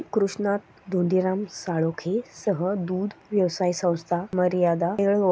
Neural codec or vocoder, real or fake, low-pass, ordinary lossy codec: none; real; none; none